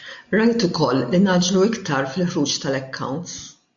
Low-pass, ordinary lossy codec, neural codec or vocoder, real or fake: 7.2 kHz; AAC, 64 kbps; none; real